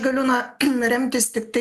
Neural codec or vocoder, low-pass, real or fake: none; 14.4 kHz; real